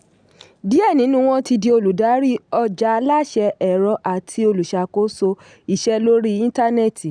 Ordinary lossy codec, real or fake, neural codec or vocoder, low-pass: none; real; none; 9.9 kHz